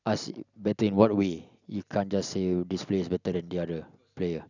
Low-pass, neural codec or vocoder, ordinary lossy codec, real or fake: 7.2 kHz; none; none; real